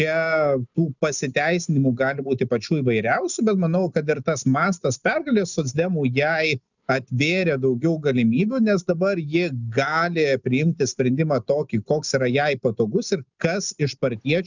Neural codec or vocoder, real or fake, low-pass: none; real; 7.2 kHz